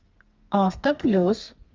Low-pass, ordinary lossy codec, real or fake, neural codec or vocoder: 7.2 kHz; Opus, 32 kbps; fake; codec, 32 kHz, 1.9 kbps, SNAC